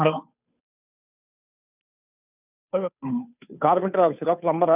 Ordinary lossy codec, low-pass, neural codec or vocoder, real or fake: none; 3.6 kHz; codec, 16 kHz, 2 kbps, FunCodec, trained on Chinese and English, 25 frames a second; fake